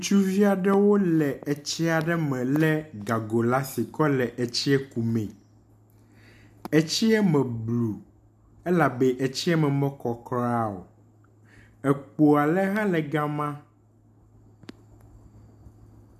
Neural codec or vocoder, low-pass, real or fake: none; 14.4 kHz; real